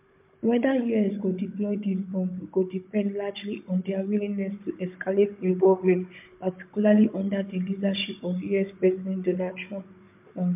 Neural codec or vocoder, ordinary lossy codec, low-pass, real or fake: codec, 16 kHz, 16 kbps, FunCodec, trained on Chinese and English, 50 frames a second; MP3, 32 kbps; 3.6 kHz; fake